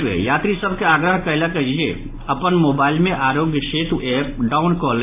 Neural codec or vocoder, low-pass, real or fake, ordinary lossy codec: none; 3.6 kHz; real; AAC, 24 kbps